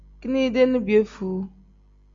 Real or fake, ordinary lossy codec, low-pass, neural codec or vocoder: real; Opus, 64 kbps; 7.2 kHz; none